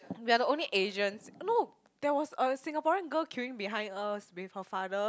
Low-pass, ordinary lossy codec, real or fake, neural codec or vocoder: none; none; real; none